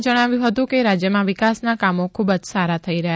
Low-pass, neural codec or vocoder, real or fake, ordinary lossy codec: none; none; real; none